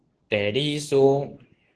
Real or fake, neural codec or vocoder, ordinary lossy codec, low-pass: fake; codec, 24 kHz, 0.9 kbps, WavTokenizer, medium speech release version 2; Opus, 16 kbps; 10.8 kHz